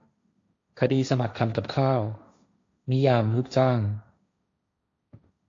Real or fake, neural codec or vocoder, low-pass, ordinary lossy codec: fake; codec, 16 kHz, 1.1 kbps, Voila-Tokenizer; 7.2 kHz; AAC, 48 kbps